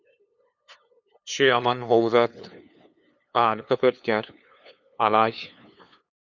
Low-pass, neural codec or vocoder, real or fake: 7.2 kHz; codec, 16 kHz, 2 kbps, FunCodec, trained on LibriTTS, 25 frames a second; fake